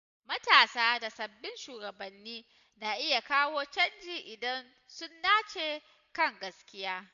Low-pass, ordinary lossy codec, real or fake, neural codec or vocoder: 7.2 kHz; none; real; none